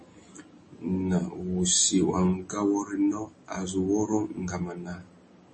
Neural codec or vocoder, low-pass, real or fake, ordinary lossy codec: none; 10.8 kHz; real; MP3, 32 kbps